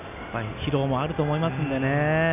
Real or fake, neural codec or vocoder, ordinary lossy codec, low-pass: real; none; none; 3.6 kHz